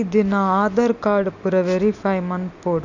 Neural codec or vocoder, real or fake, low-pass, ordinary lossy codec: none; real; 7.2 kHz; none